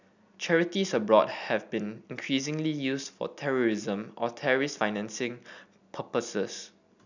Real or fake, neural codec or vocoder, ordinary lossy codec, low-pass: real; none; none; 7.2 kHz